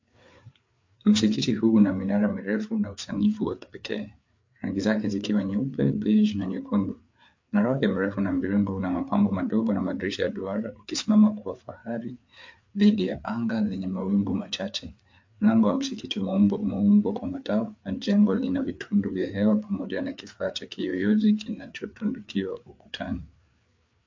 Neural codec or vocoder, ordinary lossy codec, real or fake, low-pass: codec, 16 kHz, 4 kbps, FreqCodec, larger model; MP3, 48 kbps; fake; 7.2 kHz